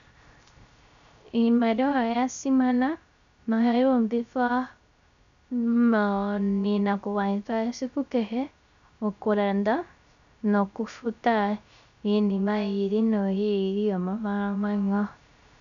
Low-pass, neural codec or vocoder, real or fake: 7.2 kHz; codec, 16 kHz, 0.3 kbps, FocalCodec; fake